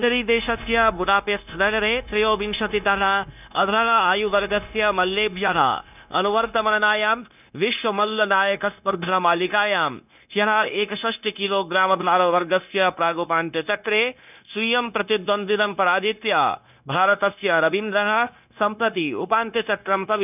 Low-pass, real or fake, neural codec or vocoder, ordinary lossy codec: 3.6 kHz; fake; codec, 16 kHz, 0.9 kbps, LongCat-Audio-Codec; none